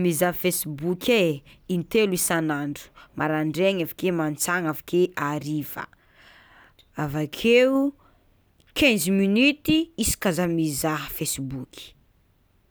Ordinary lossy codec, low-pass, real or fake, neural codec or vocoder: none; none; real; none